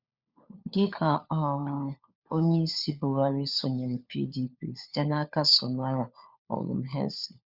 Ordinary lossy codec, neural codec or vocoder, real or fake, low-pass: Opus, 64 kbps; codec, 16 kHz, 4 kbps, FunCodec, trained on LibriTTS, 50 frames a second; fake; 5.4 kHz